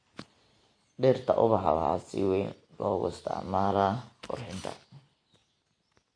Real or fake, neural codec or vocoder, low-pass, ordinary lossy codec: real; none; 9.9 kHz; MP3, 48 kbps